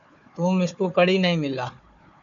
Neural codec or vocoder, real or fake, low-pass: codec, 16 kHz, 4 kbps, FunCodec, trained on Chinese and English, 50 frames a second; fake; 7.2 kHz